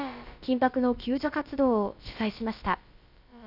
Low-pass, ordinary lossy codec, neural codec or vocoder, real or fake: 5.4 kHz; none; codec, 16 kHz, about 1 kbps, DyCAST, with the encoder's durations; fake